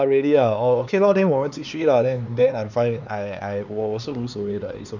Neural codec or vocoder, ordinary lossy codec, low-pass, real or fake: codec, 16 kHz, 4 kbps, X-Codec, HuBERT features, trained on LibriSpeech; none; 7.2 kHz; fake